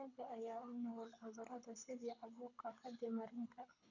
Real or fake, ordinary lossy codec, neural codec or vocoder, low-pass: fake; none; codec, 24 kHz, 6 kbps, HILCodec; 7.2 kHz